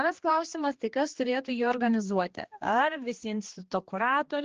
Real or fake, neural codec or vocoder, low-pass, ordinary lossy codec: fake; codec, 16 kHz, 2 kbps, X-Codec, HuBERT features, trained on general audio; 7.2 kHz; Opus, 16 kbps